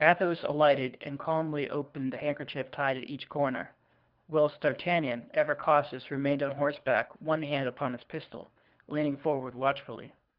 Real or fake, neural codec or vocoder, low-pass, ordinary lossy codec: fake; codec, 24 kHz, 3 kbps, HILCodec; 5.4 kHz; Opus, 64 kbps